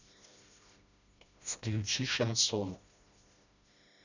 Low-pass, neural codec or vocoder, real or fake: 7.2 kHz; codec, 16 kHz, 1 kbps, FreqCodec, smaller model; fake